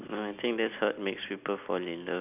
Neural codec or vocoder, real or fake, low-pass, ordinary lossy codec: none; real; 3.6 kHz; none